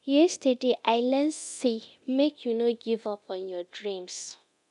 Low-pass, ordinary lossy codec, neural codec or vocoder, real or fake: 10.8 kHz; none; codec, 24 kHz, 0.9 kbps, DualCodec; fake